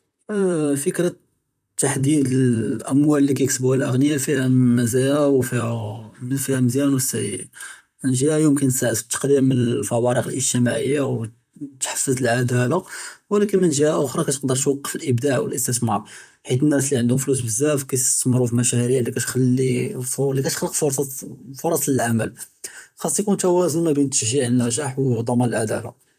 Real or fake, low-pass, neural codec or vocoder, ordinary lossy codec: fake; 14.4 kHz; vocoder, 44.1 kHz, 128 mel bands, Pupu-Vocoder; none